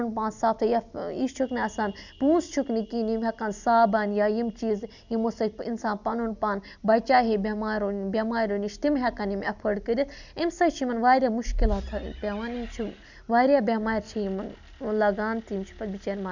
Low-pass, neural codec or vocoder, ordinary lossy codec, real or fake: 7.2 kHz; none; none; real